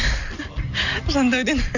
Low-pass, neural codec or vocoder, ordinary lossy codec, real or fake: 7.2 kHz; none; none; real